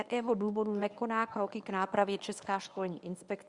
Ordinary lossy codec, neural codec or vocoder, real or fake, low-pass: Opus, 64 kbps; codec, 24 kHz, 0.9 kbps, WavTokenizer, medium speech release version 1; fake; 10.8 kHz